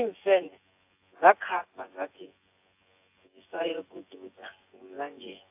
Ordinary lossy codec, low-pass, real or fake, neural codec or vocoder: AAC, 24 kbps; 3.6 kHz; fake; vocoder, 24 kHz, 100 mel bands, Vocos